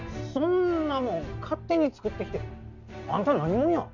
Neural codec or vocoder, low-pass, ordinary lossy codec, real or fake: none; 7.2 kHz; none; real